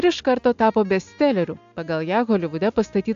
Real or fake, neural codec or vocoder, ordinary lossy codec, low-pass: real; none; AAC, 64 kbps; 7.2 kHz